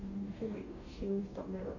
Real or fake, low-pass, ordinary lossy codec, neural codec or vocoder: fake; 7.2 kHz; none; codec, 44.1 kHz, 2.6 kbps, DAC